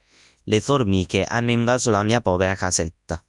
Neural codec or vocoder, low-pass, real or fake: codec, 24 kHz, 0.9 kbps, WavTokenizer, large speech release; 10.8 kHz; fake